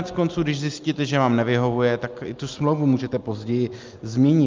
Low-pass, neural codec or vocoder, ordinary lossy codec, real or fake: 7.2 kHz; none; Opus, 24 kbps; real